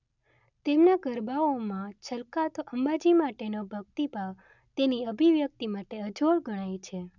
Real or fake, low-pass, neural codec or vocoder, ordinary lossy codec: real; 7.2 kHz; none; none